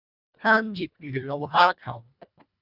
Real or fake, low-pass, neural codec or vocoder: fake; 5.4 kHz; codec, 24 kHz, 1.5 kbps, HILCodec